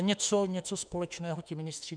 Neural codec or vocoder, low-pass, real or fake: autoencoder, 48 kHz, 32 numbers a frame, DAC-VAE, trained on Japanese speech; 9.9 kHz; fake